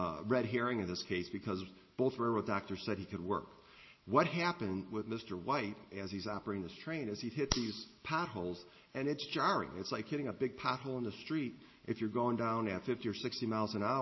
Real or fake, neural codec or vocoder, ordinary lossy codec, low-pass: real; none; MP3, 24 kbps; 7.2 kHz